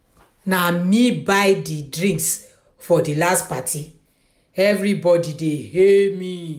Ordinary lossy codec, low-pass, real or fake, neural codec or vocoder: none; 19.8 kHz; real; none